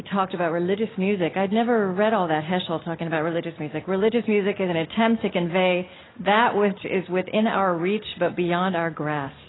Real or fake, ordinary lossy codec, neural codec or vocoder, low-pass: real; AAC, 16 kbps; none; 7.2 kHz